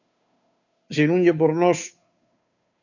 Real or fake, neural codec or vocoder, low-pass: fake; codec, 16 kHz, 2 kbps, FunCodec, trained on Chinese and English, 25 frames a second; 7.2 kHz